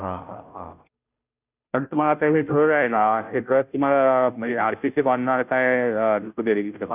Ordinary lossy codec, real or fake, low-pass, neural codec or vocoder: none; fake; 3.6 kHz; codec, 16 kHz, 0.5 kbps, FunCodec, trained on Chinese and English, 25 frames a second